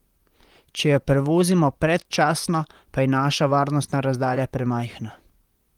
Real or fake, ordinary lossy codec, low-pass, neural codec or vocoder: fake; Opus, 24 kbps; 19.8 kHz; vocoder, 44.1 kHz, 128 mel bands, Pupu-Vocoder